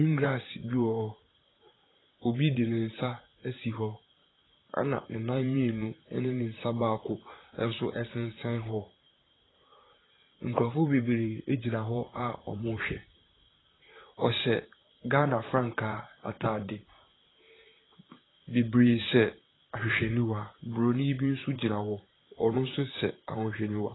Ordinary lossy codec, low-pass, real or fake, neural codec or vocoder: AAC, 16 kbps; 7.2 kHz; fake; vocoder, 44.1 kHz, 128 mel bands, Pupu-Vocoder